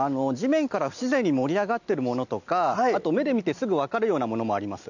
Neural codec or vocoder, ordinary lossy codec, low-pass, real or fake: none; none; 7.2 kHz; real